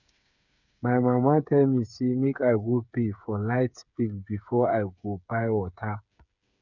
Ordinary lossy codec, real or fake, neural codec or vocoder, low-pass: none; fake; codec, 16 kHz, 8 kbps, FreqCodec, smaller model; 7.2 kHz